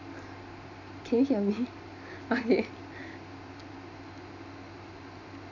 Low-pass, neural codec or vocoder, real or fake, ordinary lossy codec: 7.2 kHz; none; real; none